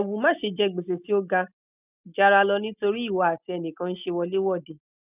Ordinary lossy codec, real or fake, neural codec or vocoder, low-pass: none; real; none; 3.6 kHz